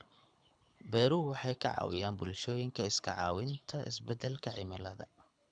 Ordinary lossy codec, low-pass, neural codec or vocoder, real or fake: none; 9.9 kHz; codec, 24 kHz, 6 kbps, HILCodec; fake